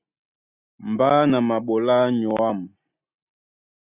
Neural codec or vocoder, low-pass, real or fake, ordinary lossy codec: none; 3.6 kHz; real; Opus, 64 kbps